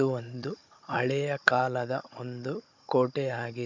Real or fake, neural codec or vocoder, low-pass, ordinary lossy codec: fake; codec, 16 kHz, 16 kbps, FunCodec, trained on Chinese and English, 50 frames a second; 7.2 kHz; MP3, 64 kbps